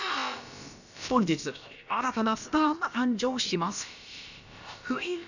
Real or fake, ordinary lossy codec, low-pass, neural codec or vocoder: fake; none; 7.2 kHz; codec, 16 kHz, about 1 kbps, DyCAST, with the encoder's durations